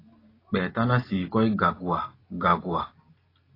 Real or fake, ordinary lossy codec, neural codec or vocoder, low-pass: real; AAC, 24 kbps; none; 5.4 kHz